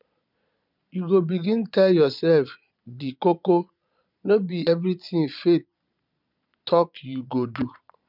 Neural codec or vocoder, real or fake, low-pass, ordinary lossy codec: vocoder, 22.05 kHz, 80 mel bands, Vocos; fake; 5.4 kHz; none